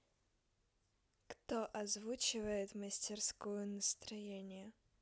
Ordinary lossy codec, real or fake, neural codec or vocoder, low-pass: none; real; none; none